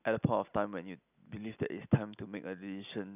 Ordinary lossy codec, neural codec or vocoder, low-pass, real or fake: none; none; 3.6 kHz; real